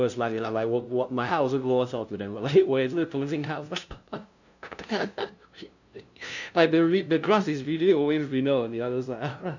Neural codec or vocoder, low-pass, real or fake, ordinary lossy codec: codec, 16 kHz, 0.5 kbps, FunCodec, trained on LibriTTS, 25 frames a second; 7.2 kHz; fake; none